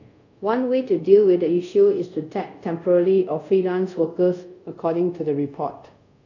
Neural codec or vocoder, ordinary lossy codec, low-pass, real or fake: codec, 24 kHz, 0.5 kbps, DualCodec; none; 7.2 kHz; fake